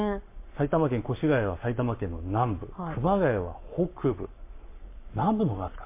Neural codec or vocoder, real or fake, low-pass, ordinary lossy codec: codec, 44.1 kHz, 7.8 kbps, Pupu-Codec; fake; 3.6 kHz; MP3, 24 kbps